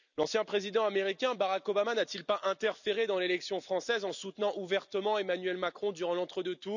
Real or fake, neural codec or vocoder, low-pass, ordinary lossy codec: real; none; 7.2 kHz; none